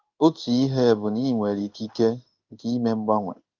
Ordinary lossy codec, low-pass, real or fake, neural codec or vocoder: Opus, 32 kbps; 7.2 kHz; fake; codec, 16 kHz in and 24 kHz out, 1 kbps, XY-Tokenizer